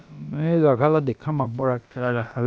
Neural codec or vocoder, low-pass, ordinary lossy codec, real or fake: codec, 16 kHz, about 1 kbps, DyCAST, with the encoder's durations; none; none; fake